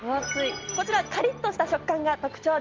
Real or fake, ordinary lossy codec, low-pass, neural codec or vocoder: real; Opus, 32 kbps; 7.2 kHz; none